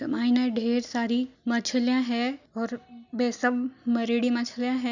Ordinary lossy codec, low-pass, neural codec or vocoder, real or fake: none; 7.2 kHz; none; real